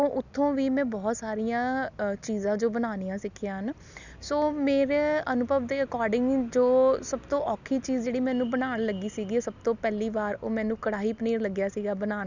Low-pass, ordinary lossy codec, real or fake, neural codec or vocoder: 7.2 kHz; none; real; none